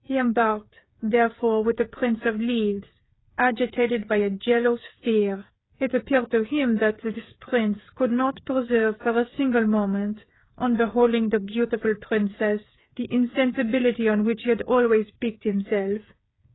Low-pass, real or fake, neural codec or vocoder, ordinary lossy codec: 7.2 kHz; fake; codec, 16 kHz, 8 kbps, FreqCodec, smaller model; AAC, 16 kbps